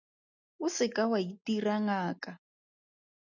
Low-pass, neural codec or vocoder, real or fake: 7.2 kHz; none; real